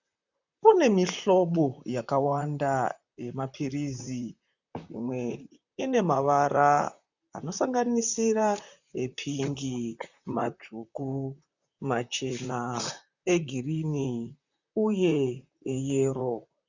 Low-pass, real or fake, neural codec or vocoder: 7.2 kHz; fake; vocoder, 44.1 kHz, 128 mel bands, Pupu-Vocoder